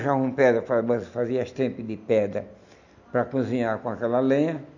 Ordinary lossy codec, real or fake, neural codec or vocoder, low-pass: none; real; none; 7.2 kHz